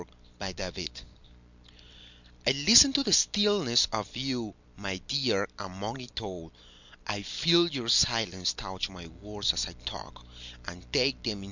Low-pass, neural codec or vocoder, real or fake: 7.2 kHz; none; real